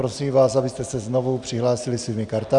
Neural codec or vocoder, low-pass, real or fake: none; 10.8 kHz; real